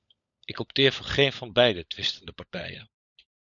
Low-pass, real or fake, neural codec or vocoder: 7.2 kHz; fake; codec, 16 kHz, 16 kbps, FunCodec, trained on LibriTTS, 50 frames a second